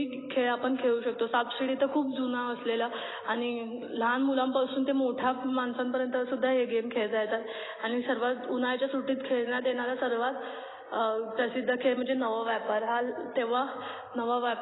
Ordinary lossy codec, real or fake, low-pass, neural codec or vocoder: AAC, 16 kbps; real; 7.2 kHz; none